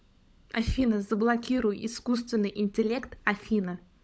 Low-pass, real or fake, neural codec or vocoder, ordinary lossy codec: none; fake; codec, 16 kHz, 16 kbps, FunCodec, trained on LibriTTS, 50 frames a second; none